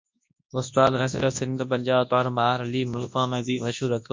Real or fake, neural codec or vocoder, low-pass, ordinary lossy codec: fake; codec, 24 kHz, 0.9 kbps, WavTokenizer, large speech release; 7.2 kHz; MP3, 48 kbps